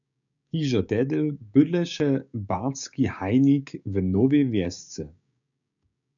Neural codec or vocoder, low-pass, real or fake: codec, 16 kHz, 6 kbps, DAC; 7.2 kHz; fake